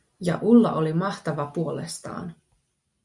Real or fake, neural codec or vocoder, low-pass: real; none; 10.8 kHz